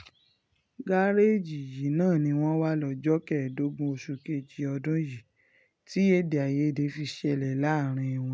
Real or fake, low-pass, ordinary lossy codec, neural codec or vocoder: real; none; none; none